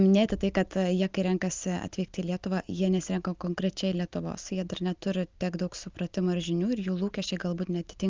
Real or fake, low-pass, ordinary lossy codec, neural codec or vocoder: real; 7.2 kHz; Opus, 24 kbps; none